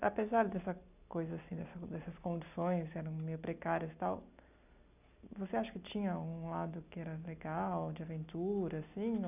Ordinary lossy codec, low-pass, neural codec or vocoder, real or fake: none; 3.6 kHz; none; real